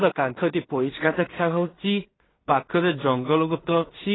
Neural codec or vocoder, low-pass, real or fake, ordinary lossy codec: codec, 16 kHz in and 24 kHz out, 0.4 kbps, LongCat-Audio-Codec, two codebook decoder; 7.2 kHz; fake; AAC, 16 kbps